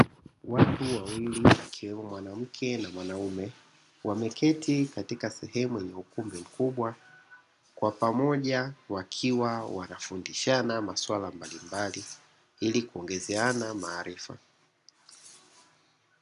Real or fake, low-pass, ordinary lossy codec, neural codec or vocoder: real; 10.8 kHz; MP3, 96 kbps; none